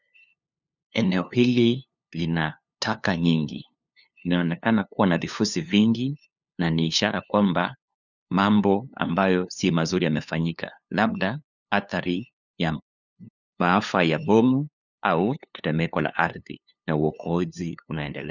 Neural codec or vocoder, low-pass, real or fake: codec, 16 kHz, 2 kbps, FunCodec, trained on LibriTTS, 25 frames a second; 7.2 kHz; fake